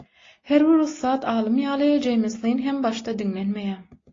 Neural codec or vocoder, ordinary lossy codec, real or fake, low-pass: none; AAC, 32 kbps; real; 7.2 kHz